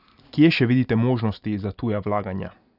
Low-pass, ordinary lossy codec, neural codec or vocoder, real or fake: 5.4 kHz; none; none; real